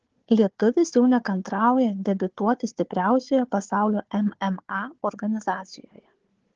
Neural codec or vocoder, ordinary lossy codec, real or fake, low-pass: codec, 16 kHz, 4 kbps, FunCodec, trained on Chinese and English, 50 frames a second; Opus, 16 kbps; fake; 7.2 kHz